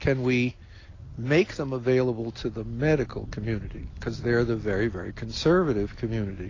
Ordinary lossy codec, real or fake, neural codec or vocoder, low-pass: AAC, 32 kbps; real; none; 7.2 kHz